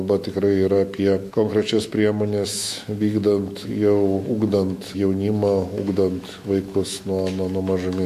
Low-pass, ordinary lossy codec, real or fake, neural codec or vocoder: 14.4 kHz; MP3, 64 kbps; fake; autoencoder, 48 kHz, 128 numbers a frame, DAC-VAE, trained on Japanese speech